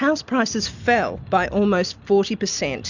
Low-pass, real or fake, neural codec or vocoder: 7.2 kHz; real; none